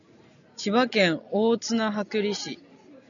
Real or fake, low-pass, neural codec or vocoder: real; 7.2 kHz; none